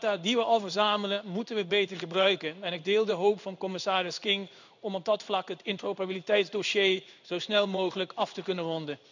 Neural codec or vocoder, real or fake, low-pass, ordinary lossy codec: codec, 16 kHz in and 24 kHz out, 1 kbps, XY-Tokenizer; fake; 7.2 kHz; none